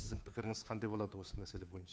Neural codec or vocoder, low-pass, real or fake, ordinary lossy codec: codec, 16 kHz, 2 kbps, FunCodec, trained on Chinese and English, 25 frames a second; none; fake; none